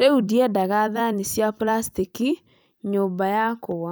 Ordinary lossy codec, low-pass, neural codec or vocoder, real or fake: none; none; vocoder, 44.1 kHz, 128 mel bands every 256 samples, BigVGAN v2; fake